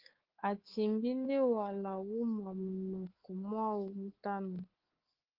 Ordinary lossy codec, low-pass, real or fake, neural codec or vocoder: Opus, 16 kbps; 5.4 kHz; fake; codec, 24 kHz, 3.1 kbps, DualCodec